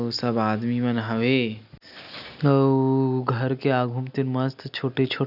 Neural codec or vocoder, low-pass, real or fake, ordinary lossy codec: none; 5.4 kHz; real; none